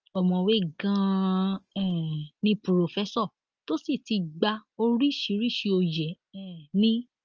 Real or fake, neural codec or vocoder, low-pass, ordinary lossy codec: real; none; 7.2 kHz; Opus, 24 kbps